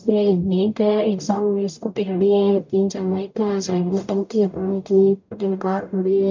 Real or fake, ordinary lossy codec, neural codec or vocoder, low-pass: fake; MP3, 64 kbps; codec, 44.1 kHz, 0.9 kbps, DAC; 7.2 kHz